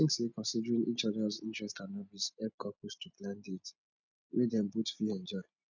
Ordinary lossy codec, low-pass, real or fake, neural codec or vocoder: none; 7.2 kHz; real; none